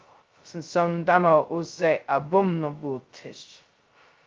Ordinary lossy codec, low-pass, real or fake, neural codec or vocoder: Opus, 24 kbps; 7.2 kHz; fake; codec, 16 kHz, 0.2 kbps, FocalCodec